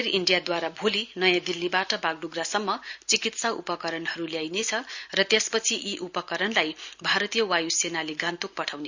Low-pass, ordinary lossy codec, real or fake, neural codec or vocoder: 7.2 kHz; Opus, 64 kbps; real; none